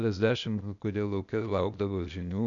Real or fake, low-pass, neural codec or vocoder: fake; 7.2 kHz; codec, 16 kHz, 0.8 kbps, ZipCodec